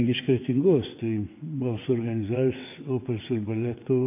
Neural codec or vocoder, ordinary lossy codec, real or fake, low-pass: vocoder, 22.05 kHz, 80 mel bands, WaveNeXt; MP3, 24 kbps; fake; 3.6 kHz